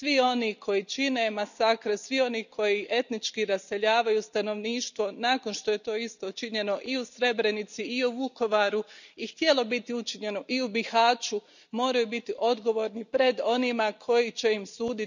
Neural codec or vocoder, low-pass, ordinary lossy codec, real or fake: none; 7.2 kHz; none; real